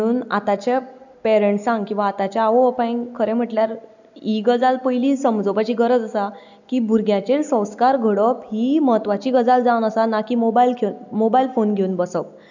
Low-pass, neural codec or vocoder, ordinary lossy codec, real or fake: 7.2 kHz; none; none; real